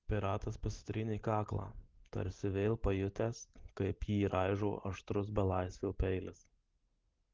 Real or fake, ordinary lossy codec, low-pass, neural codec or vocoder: real; Opus, 16 kbps; 7.2 kHz; none